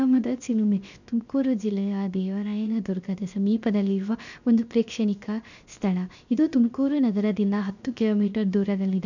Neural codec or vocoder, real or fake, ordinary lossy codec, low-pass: codec, 16 kHz, 0.7 kbps, FocalCodec; fake; none; 7.2 kHz